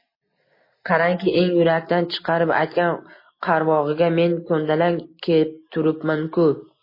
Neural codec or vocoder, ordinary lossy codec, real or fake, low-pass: none; MP3, 24 kbps; real; 5.4 kHz